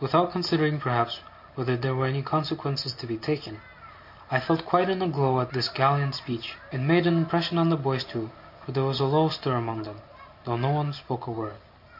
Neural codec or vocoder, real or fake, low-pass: none; real; 5.4 kHz